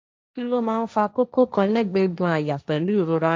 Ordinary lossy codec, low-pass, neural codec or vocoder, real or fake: none; none; codec, 16 kHz, 1.1 kbps, Voila-Tokenizer; fake